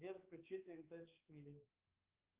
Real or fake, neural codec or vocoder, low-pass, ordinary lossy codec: fake; codec, 16 kHz, 4 kbps, X-Codec, HuBERT features, trained on balanced general audio; 3.6 kHz; Opus, 32 kbps